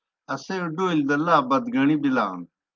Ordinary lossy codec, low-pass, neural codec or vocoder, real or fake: Opus, 24 kbps; 7.2 kHz; none; real